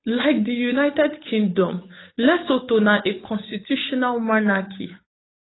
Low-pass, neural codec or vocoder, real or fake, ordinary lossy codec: 7.2 kHz; codec, 16 kHz, 8 kbps, FunCodec, trained on Chinese and English, 25 frames a second; fake; AAC, 16 kbps